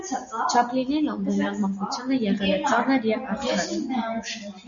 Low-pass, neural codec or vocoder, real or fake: 7.2 kHz; none; real